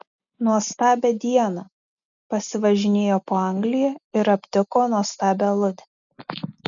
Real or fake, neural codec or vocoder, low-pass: real; none; 7.2 kHz